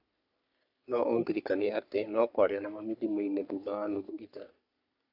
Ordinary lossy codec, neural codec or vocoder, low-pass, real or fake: none; codec, 44.1 kHz, 3.4 kbps, Pupu-Codec; 5.4 kHz; fake